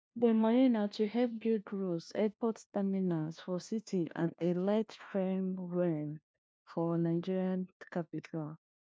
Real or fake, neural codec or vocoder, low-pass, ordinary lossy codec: fake; codec, 16 kHz, 1 kbps, FunCodec, trained on LibriTTS, 50 frames a second; none; none